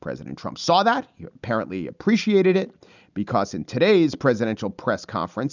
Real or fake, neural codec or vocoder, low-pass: real; none; 7.2 kHz